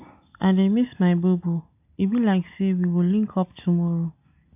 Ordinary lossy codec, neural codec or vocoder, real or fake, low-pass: AAC, 32 kbps; none; real; 3.6 kHz